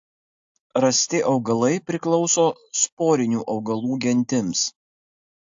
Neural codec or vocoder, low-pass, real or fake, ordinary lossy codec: none; 7.2 kHz; real; AAC, 64 kbps